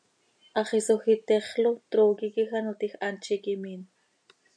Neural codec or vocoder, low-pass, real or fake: none; 9.9 kHz; real